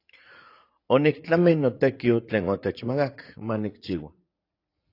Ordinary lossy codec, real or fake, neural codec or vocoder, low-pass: AAC, 32 kbps; fake; vocoder, 44.1 kHz, 80 mel bands, Vocos; 5.4 kHz